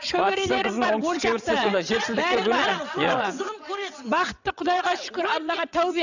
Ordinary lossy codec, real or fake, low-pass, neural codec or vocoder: none; fake; 7.2 kHz; vocoder, 22.05 kHz, 80 mel bands, WaveNeXt